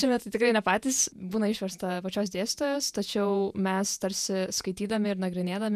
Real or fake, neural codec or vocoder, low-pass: fake; vocoder, 48 kHz, 128 mel bands, Vocos; 14.4 kHz